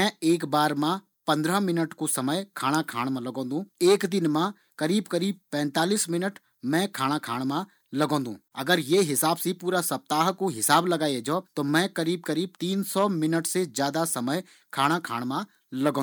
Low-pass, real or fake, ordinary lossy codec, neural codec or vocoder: none; real; none; none